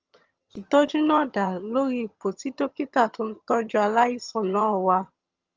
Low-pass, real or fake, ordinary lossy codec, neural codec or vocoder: 7.2 kHz; fake; Opus, 24 kbps; vocoder, 22.05 kHz, 80 mel bands, HiFi-GAN